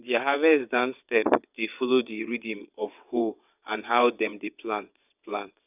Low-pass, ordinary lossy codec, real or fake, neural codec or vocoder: 3.6 kHz; none; fake; vocoder, 22.05 kHz, 80 mel bands, WaveNeXt